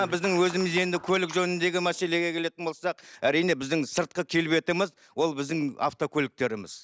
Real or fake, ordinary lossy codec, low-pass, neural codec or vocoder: real; none; none; none